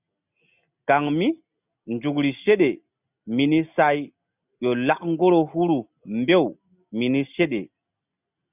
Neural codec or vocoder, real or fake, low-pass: none; real; 3.6 kHz